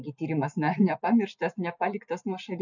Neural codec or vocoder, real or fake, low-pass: none; real; 7.2 kHz